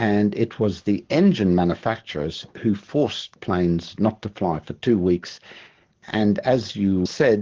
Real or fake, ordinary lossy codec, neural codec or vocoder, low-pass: fake; Opus, 16 kbps; codec, 44.1 kHz, 7.8 kbps, DAC; 7.2 kHz